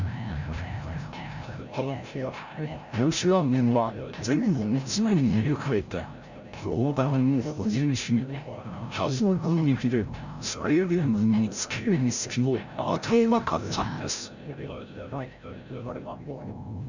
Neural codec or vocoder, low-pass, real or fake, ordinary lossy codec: codec, 16 kHz, 0.5 kbps, FreqCodec, larger model; 7.2 kHz; fake; none